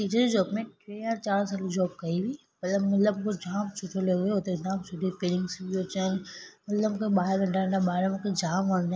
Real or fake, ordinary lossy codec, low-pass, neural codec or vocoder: real; none; none; none